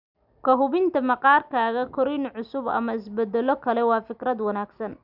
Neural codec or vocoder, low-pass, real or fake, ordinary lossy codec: none; 5.4 kHz; real; none